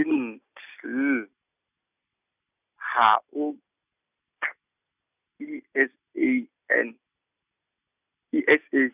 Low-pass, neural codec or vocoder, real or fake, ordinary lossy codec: 3.6 kHz; none; real; none